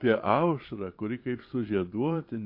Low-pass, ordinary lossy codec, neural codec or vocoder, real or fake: 5.4 kHz; MP3, 32 kbps; vocoder, 22.05 kHz, 80 mel bands, Vocos; fake